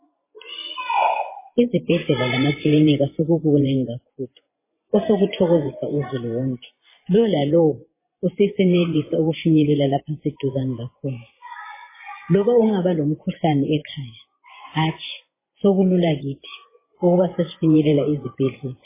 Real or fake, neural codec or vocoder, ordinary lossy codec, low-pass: fake; vocoder, 24 kHz, 100 mel bands, Vocos; MP3, 16 kbps; 3.6 kHz